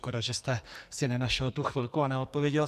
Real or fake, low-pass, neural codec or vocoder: fake; 14.4 kHz; codec, 44.1 kHz, 2.6 kbps, SNAC